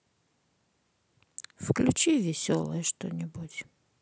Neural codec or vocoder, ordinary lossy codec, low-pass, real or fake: none; none; none; real